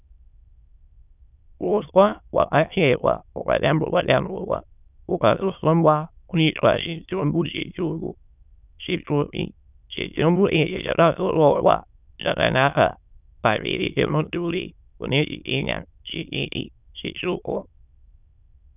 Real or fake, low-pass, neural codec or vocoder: fake; 3.6 kHz; autoencoder, 22.05 kHz, a latent of 192 numbers a frame, VITS, trained on many speakers